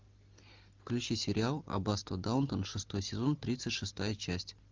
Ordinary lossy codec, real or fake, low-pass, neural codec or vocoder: Opus, 32 kbps; real; 7.2 kHz; none